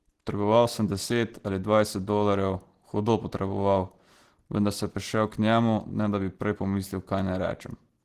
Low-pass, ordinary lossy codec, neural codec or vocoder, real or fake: 14.4 kHz; Opus, 16 kbps; none; real